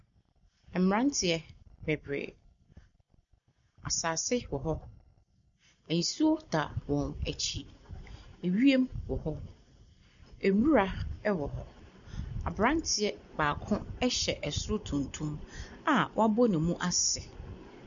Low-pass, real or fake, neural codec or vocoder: 7.2 kHz; real; none